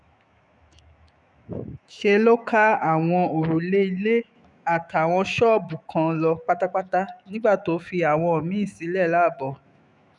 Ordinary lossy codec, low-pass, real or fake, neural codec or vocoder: none; 10.8 kHz; fake; autoencoder, 48 kHz, 128 numbers a frame, DAC-VAE, trained on Japanese speech